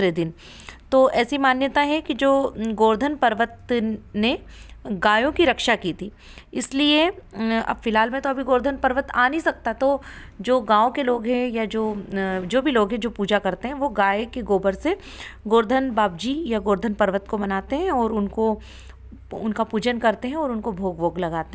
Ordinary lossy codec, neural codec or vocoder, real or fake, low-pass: none; none; real; none